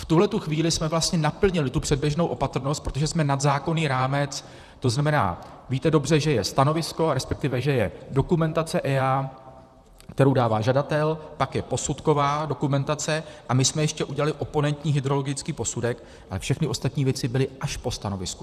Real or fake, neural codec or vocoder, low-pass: fake; vocoder, 44.1 kHz, 128 mel bands, Pupu-Vocoder; 14.4 kHz